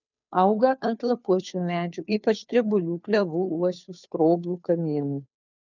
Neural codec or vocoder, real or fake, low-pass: codec, 16 kHz, 2 kbps, FunCodec, trained on Chinese and English, 25 frames a second; fake; 7.2 kHz